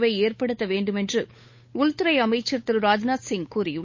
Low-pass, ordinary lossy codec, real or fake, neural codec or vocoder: 7.2 kHz; MP3, 32 kbps; fake; codec, 16 kHz, 8 kbps, FunCodec, trained on Chinese and English, 25 frames a second